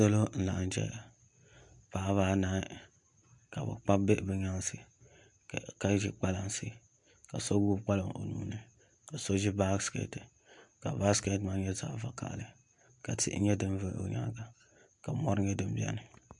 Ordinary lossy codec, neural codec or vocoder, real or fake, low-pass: MP3, 64 kbps; none; real; 10.8 kHz